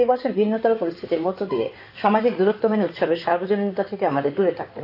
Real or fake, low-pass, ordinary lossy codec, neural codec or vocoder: fake; 5.4 kHz; AAC, 32 kbps; codec, 16 kHz in and 24 kHz out, 2.2 kbps, FireRedTTS-2 codec